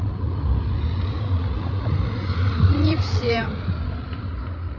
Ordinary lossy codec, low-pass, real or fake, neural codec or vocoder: none; 7.2 kHz; fake; codec, 16 kHz, 16 kbps, FreqCodec, larger model